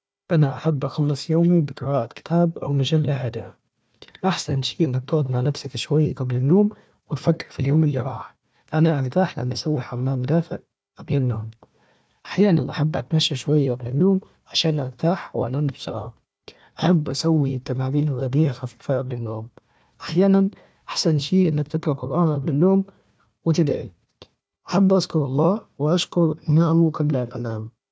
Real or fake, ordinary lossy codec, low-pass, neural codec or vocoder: fake; none; none; codec, 16 kHz, 1 kbps, FunCodec, trained on Chinese and English, 50 frames a second